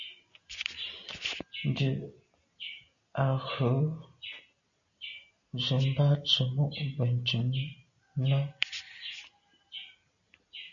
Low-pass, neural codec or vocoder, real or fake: 7.2 kHz; none; real